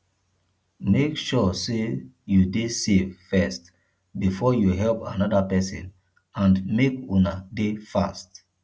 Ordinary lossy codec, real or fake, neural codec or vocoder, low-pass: none; real; none; none